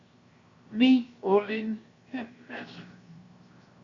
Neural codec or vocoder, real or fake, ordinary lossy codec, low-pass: codec, 16 kHz, 0.7 kbps, FocalCodec; fake; Opus, 64 kbps; 7.2 kHz